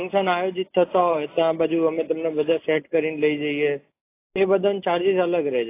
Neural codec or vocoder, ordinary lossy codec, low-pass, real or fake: none; AAC, 24 kbps; 3.6 kHz; real